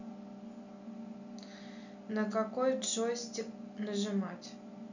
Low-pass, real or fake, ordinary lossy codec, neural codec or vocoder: 7.2 kHz; real; none; none